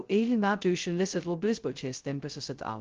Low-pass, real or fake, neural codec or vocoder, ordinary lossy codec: 7.2 kHz; fake; codec, 16 kHz, 0.2 kbps, FocalCodec; Opus, 16 kbps